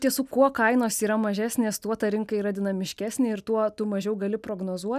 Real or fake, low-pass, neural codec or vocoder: real; 14.4 kHz; none